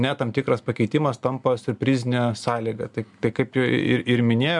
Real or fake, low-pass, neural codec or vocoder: real; 10.8 kHz; none